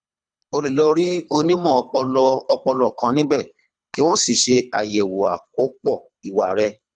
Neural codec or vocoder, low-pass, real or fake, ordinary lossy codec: codec, 24 kHz, 3 kbps, HILCodec; 9.9 kHz; fake; none